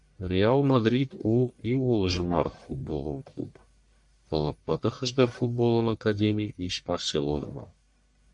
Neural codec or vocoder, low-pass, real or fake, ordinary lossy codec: codec, 44.1 kHz, 1.7 kbps, Pupu-Codec; 10.8 kHz; fake; MP3, 96 kbps